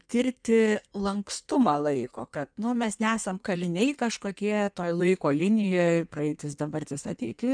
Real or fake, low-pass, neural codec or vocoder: fake; 9.9 kHz; codec, 16 kHz in and 24 kHz out, 1.1 kbps, FireRedTTS-2 codec